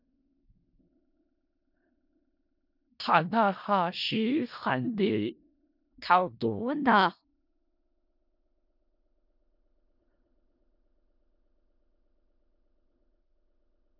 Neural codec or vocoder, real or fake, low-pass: codec, 16 kHz in and 24 kHz out, 0.4 kbps, LongCat-Audio-Codec, four codebook decoder; fake; 5.4 kHz